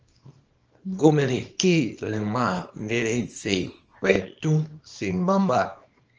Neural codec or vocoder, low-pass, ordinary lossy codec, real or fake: codec, 24 kHz, 0.9 kbps, WavTokenizer, small release; 7.2 kHz; Opus, 24 kbps; fake